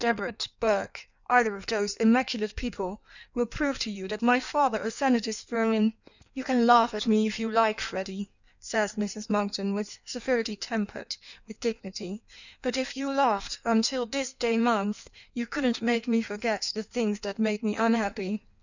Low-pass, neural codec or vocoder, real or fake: 7.2 kHz; codec, 16 kHz in and 24 kHz out, 1.1 kbps, FireRedTTS-2 codec; fake